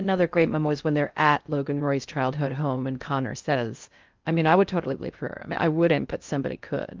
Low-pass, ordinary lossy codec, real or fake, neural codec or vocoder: 7.2 kHz; Opus, 24 kbps; fake; codec, 16 kHz in and 24 kHz out, 0.6 kbps, FocalCodec, streaming, 4096 codes